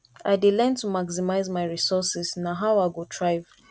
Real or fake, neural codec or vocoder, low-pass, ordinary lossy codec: real; none; none; none